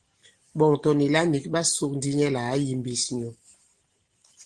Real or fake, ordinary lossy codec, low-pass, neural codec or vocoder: real; Opus, 16 kbps; 9.9 kHz; none